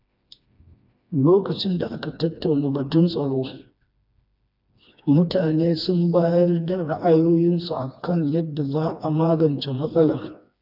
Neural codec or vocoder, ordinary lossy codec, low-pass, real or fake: codec, 16 kHz, 2 kbps, FreqCodec, smaller model; none; 5.4 kHz; fake